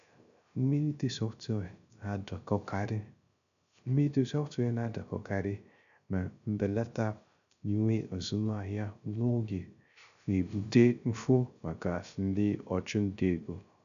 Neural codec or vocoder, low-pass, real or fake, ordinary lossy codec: codec, 16 kHz, 0.3 kbps, FocalCodec; 7.2 kHz; fake; none